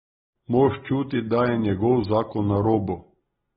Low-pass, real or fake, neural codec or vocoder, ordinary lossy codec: 10.8 kHz; real; none; AAC, 16 kbps